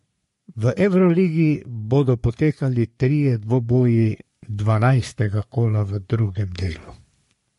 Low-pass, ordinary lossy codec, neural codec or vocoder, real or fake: 14.4 kHz; MP3, 48 kbps; codec, 44.1 kHz, 3.4 kbps, Pupu-Codec; fake